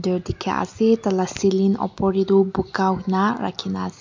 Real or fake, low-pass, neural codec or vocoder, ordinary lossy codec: real; 7.2 kHz; none; MP3, 64 kbps